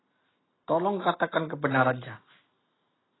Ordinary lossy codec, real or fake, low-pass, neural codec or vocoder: AAC, 16 kbps; real; 7.2 kHz; none